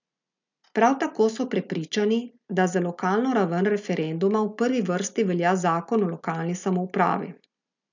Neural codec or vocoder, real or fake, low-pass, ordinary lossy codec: none; real; 7.2 kHz; none